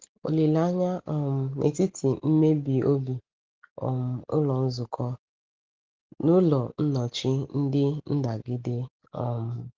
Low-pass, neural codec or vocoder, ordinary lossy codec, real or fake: 7.2 kHz; none; Opus, 16 kbps; real